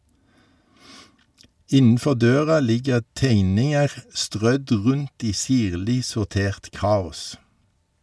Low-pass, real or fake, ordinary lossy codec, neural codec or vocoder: none; real; none; none